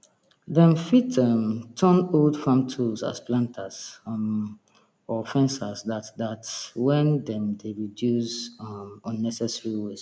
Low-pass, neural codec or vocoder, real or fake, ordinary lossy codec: none; none; real; none